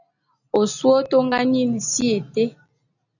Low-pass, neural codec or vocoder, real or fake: 7.2 kHz; none; real